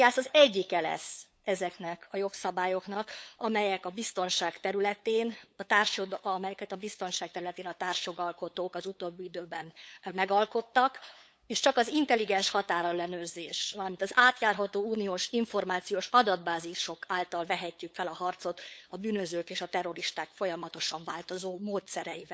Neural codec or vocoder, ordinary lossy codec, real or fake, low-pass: codec, 16 kHz, 8 kbps, FunCodec, trained on LibriTTS, 25 frames a second; none; fake; none